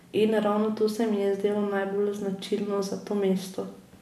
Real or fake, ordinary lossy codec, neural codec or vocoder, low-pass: real; none; none; 14.4 kHz